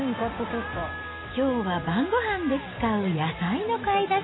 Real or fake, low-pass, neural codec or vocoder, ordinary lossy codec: real; 7.2 kHz; none; AAC, 16 kbps